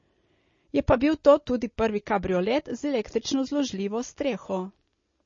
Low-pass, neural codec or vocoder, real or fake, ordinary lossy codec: 7.2 kHz; none; real; MP3, 32 kbps